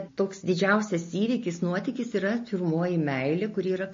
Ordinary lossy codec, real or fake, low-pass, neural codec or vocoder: MP3, 32 kbps; real; 7.2 kHz; none